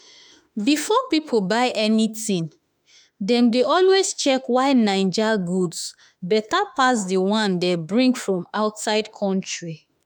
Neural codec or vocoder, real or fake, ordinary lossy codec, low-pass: autoencoder, 48 kHz, 32 numbers a frame, DAC-VAE, trained on Japanese speech; fake; none; none